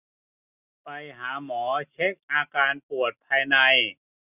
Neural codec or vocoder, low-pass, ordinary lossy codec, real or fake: none; 3.6 kHz; none; real